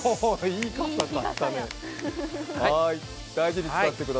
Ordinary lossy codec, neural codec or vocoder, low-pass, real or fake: none; none; none; real